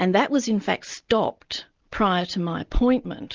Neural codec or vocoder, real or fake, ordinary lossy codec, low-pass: none; real; Opus, 32 kbps; 7.2 kHz